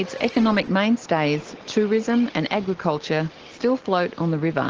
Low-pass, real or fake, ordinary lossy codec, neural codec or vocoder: 7.2 kHz; real; Opus, 16 kbps; none